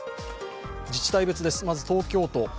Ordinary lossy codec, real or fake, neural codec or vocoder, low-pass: none; real; none; none